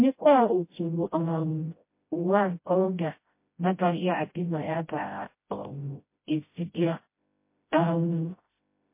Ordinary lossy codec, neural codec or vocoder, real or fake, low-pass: MP3, 24 kbps; codec, 16 kHz, 0.5 kbps, FreqCodec, smaller model; fake; 3.6 kHz